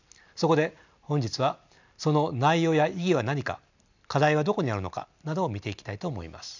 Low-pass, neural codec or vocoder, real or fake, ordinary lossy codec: 7.2 kHz; none; real; none